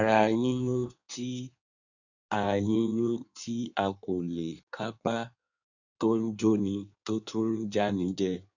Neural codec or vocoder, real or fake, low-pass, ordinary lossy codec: codec, 16 kHz in and 24 kHz out, 1.1 kbps, FireRedTTS-2 codec; fake; 7.2 kHz; none